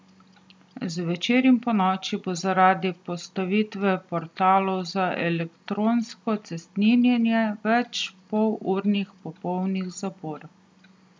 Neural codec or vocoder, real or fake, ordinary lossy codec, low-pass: none; real; none; none